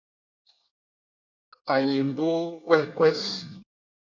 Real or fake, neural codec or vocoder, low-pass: fake; codec, 24 kHz, 1 kbps, SNAC; 7.2 kHz